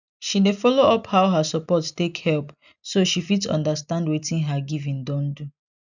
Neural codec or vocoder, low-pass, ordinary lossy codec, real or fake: none; 7.2 kHz; none; real